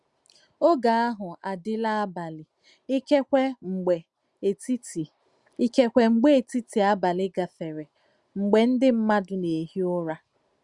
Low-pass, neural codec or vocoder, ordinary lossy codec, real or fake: 10.8 kHz; none; Opus, 64 kbps; real